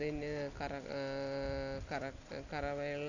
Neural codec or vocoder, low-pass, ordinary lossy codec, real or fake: none; 7.2 kHz; none; real